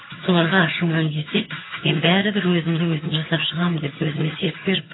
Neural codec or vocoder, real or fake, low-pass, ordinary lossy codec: vocoder, 22.05 kHz, 80 mel bands, HiFi-GAN; fake; 7.2 kHz; AAC, 16 kbps